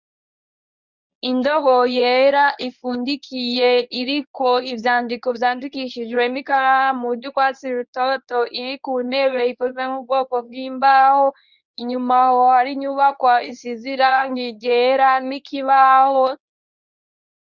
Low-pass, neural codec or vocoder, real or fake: 7.2 kHz; codec, 24 kHz, 0.9 kbps, WavTokenizer, medium speech release version 1; fake